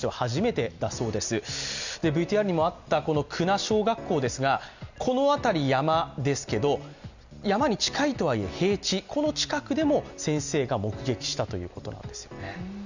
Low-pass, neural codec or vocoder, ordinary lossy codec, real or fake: 7.2 kHz; none; none; real